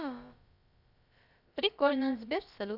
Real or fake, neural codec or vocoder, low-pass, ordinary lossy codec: fake; codec, 16 kHz, about 1 kbps, DyCAST, with the encoder's durations; 5.4 kHz; none